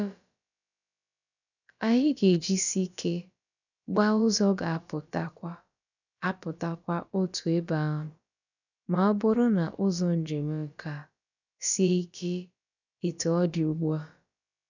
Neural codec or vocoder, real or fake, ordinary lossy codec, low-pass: codec, 16 kHz, about 1 kbps, DyCAST, with the encoder's durations; fake; none; 7.2 kHz